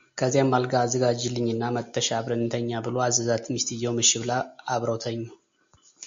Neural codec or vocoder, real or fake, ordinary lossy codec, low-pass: none; real; MP3, 48 kbps; 7.2 kHz